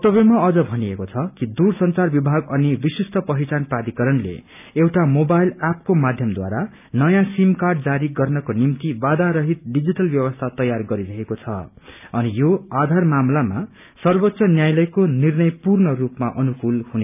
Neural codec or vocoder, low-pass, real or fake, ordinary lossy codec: none; 3.6 kHz; real; none